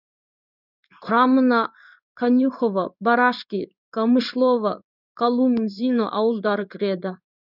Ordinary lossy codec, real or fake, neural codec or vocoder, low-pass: none; fake; codec, 16 kHz in and 24 kHz out, 1 kbps, XY-Tokenizer; 5.4 kHz